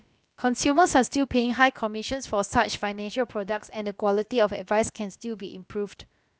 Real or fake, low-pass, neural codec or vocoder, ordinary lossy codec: fake; none; codec, 16 kHz, about 1 kbps, DyCAST, with the encoder's durations; none